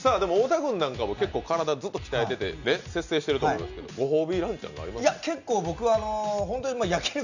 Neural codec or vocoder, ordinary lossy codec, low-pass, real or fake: none; none; 7.2 kHz; real